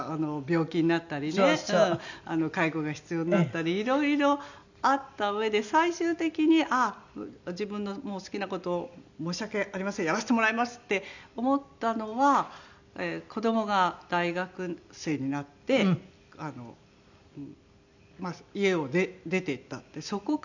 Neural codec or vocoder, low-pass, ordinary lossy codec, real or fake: none; 7.2 kHz; none; real